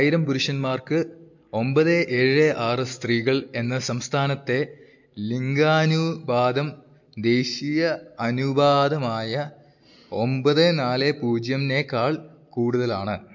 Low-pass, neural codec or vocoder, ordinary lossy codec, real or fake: 7.2 kHz; none; MP3, 48 kbps; real